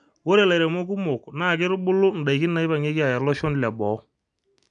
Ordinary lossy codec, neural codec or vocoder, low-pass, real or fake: none; none; 9.9 kHz; real